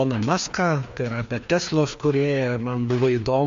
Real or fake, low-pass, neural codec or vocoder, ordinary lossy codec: fake; 7.2 kHz; codec, 16 kHz, 2 kbps, FreqCodec, larger model; MP3, 48 kbps